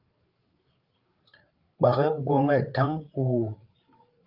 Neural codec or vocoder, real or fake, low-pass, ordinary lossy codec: codec, 16 kHz, 8 kbps, FreqCodec, larger model; fake; 5.4 kHz; Opus, 32 kbps